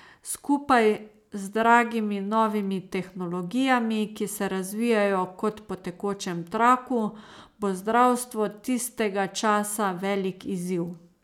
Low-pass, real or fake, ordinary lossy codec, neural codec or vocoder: 19.8 kHz; real; none; none